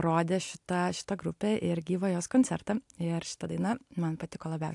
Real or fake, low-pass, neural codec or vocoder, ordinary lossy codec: real; 10.8 kHz; none; AAC, 64 kbps